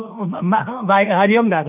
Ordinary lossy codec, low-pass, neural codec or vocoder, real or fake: none; 3.6 kHz; autoencoder, 48 kHz, 32 numbers a frame, DAC-VAE, trained on Japanese speech; fake